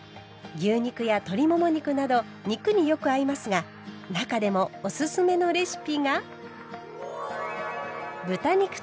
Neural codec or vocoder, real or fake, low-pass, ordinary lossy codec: none; real; none; none